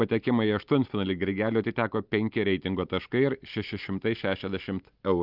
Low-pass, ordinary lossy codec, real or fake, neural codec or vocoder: 5.4 kHz; Opus, 32 kbps; real; none